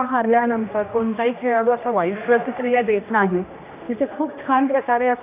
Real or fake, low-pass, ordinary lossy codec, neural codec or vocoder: fake; 3.6 kHz; AAC, 32 kbps; codec, 16 kHz, 1 kbps, X-Codec, HuBERT features, trained on general audio